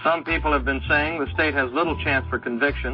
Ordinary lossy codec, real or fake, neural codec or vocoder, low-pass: MP3, 32 kbps; real; none; 5.4 kHz